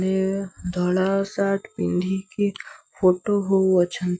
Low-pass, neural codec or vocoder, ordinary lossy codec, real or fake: none; none; none; real